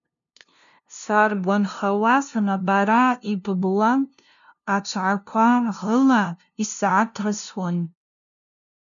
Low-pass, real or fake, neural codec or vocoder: 7.2 kHz; fake; codec, 16 kHz, 0.5 kbps, FunCodec, trained on LibriTTS, 25 frames a second